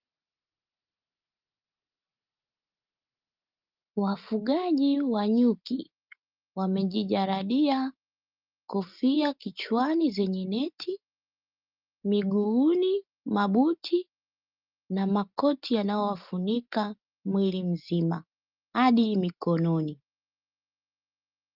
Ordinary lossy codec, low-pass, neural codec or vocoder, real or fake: Opus, 24 kbps; 5.4 kHz; none; real